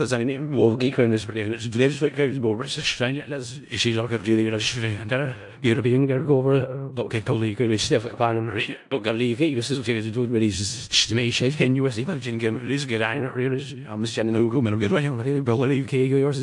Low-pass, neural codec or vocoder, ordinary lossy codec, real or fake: 10.8 kHz; codec, 16 kHz in and 24 kHz out, 0.4 kbps, LongCat-Audio-Codec, four codebook decoder; AAC, 64 kbps; fake